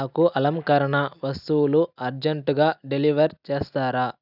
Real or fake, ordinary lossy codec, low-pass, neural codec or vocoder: real; none; 5.4 kHz; none